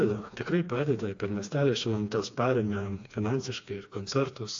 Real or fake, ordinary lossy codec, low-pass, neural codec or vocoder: fake; AAC, 48 kbps; 7.2 kHz; codec, 16 kHz, 2 kbps, FreqCodec, smaller model